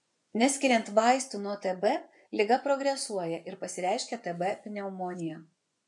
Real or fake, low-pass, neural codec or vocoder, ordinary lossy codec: fake; 10.8 kHz; vocoder, 24 kHz, 100 mel bands, Vocos; MP3, 64 kbps